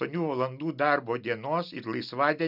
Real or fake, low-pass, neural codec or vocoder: real; 5.4 kHz; none